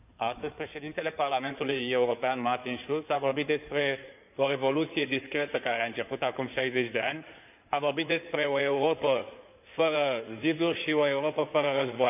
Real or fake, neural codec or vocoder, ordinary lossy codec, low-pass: fake; codec, 16 kHz in and 24 kHz out, 2.2 kbps, FireRedTTS-2 codec; none; 3.6 kHz